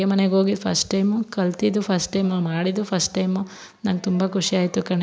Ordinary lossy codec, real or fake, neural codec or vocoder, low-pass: none; real; none; none